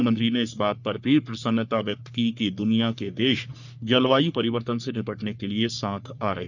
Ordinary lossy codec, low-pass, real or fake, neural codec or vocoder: none; 7.2 kHz; fake; codec, 44.1 kHz, 3.4 kbps, Pupu-Codec